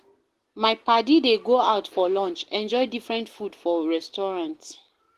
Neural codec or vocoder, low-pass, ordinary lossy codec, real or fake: none; 14.4 kHz; Opus, 16 kbps; real